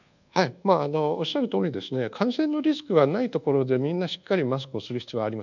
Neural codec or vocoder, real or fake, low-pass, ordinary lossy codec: codec, 24 kHz, 1.2 kbps, DualCodec; fake; 7.2 kHz; none